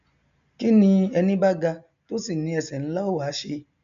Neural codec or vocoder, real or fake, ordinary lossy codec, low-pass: none; real; MP3, 64 kbps; 7.2 kHz